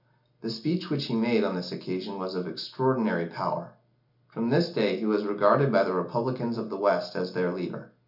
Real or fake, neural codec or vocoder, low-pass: real; none; 5.4 kHz